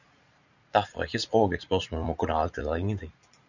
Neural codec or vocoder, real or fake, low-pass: vocoder, 44.1 kHz, 80 mel bands, Vocos; fake; 7.2 kHz